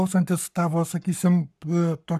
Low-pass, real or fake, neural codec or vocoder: 14.4 kHz; fake; codec, 44.1 kHz, 7.8 kbps, Pupu-Codec